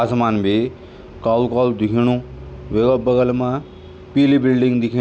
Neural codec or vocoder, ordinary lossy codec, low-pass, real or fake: none; none; none; real